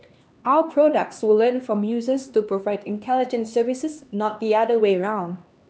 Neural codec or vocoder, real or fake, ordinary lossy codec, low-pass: codec, 16 kHz, 2 kbps, X-Codec, HuBERT features, trained on LibriSpeech; fake; none; none